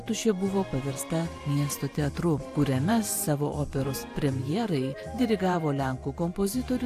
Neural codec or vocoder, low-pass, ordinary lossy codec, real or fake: vocoder, 44.1 kHz, 128 mel bands every 512 samples, BigVGAN v2; 14.4 kHz; AAC, 64 kbps; fake